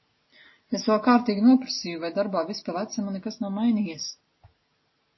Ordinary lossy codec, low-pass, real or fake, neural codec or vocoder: MP3, 24 kbps; 7.2 kHz; real; none